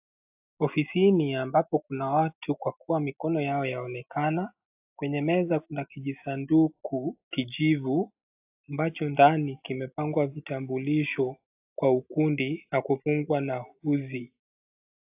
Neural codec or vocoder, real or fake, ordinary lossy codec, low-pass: none; real; AAC, 32 kbps; 3.6 kHz